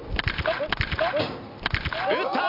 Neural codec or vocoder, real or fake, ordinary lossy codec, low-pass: none; real; none; 5.4 kHz